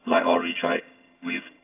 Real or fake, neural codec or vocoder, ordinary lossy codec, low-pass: fake; vocoder, 22.05 kHz, 80 mel bands, HiFi-GAN; AAC, 24 kbps; 3.6 kHz